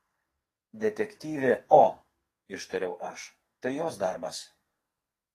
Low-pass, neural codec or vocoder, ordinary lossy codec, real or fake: 14.4 kHz; codec, 44.1 kHz, 2.6 kbps, SNAC; AAC, 48 kbps; fake